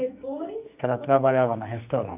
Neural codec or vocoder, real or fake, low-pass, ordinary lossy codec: codec, 44.1 kHz, 2.6 kbps, SNAC; fake; 3.6 kHz; none